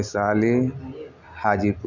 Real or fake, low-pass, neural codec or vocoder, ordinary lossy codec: real; 7.2 kHz; none; none